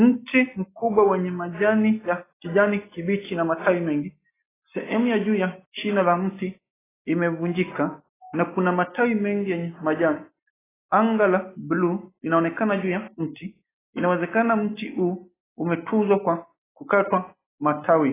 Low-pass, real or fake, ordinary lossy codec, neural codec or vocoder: 3.6 kHz; real; AAC, 16 kbps; none